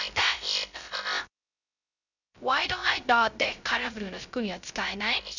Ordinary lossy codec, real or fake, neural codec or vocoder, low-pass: none; fake; codec, 16 kHz, 0.3 kbps, FocalCodec; 7.2 kHz